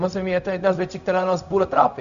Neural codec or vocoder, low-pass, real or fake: codec, 16 kHz, 0.4 kbps, LongCat-Audio-Codec; 7.2 kHz; fake